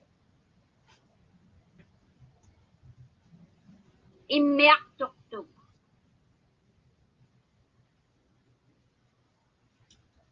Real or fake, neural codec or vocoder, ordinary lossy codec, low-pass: real; none; Opus, 32 kbps; 7.2 kHz